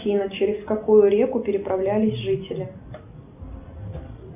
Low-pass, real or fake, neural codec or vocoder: 3.6 kHz; real; none